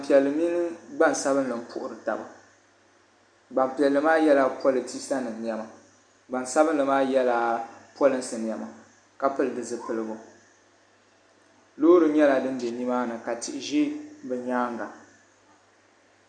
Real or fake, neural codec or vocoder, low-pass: real; none; 9.9 kHz